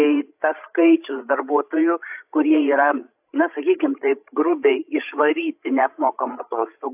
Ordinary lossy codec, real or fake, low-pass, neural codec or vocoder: AAC, 32 kbps; fake; 3.6 kHz; codec, 16 kHz, 16 kbps, FreqCodec, larger model